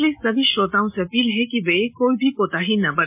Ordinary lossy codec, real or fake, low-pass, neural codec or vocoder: MP3, 32 kbps; real; 3.6 kHz; none